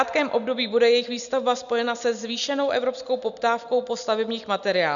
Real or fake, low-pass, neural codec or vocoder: real; 7.2 kHz; none